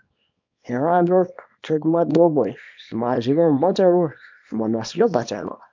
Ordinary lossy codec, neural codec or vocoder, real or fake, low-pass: MP3, 64 kbps; codec, 24 kHz, 0.9 kbps, WavTokenizer, small release; fake; 7.2 kHz